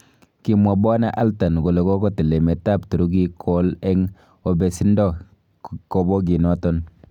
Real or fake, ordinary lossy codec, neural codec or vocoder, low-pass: real; none; none; 19.8 kHz